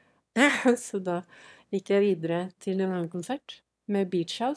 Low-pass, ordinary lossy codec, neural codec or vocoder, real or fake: none; none; autoencoder, 22.05 kHz, a latent of 192 numbers a frame, VITS, trained on one speaker; fake